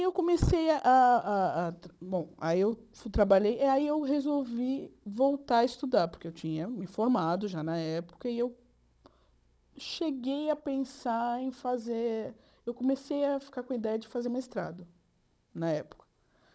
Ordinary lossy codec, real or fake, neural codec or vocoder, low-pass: none; fake; codec, 16 kHz, 16 kbps, FunCodec, trained on Chinese and English, 50 frames a second; none